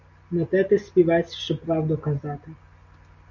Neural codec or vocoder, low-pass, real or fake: none; 7.2 kHz; real